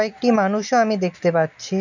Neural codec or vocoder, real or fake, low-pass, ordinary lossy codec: none; real; 7.2 kHz; none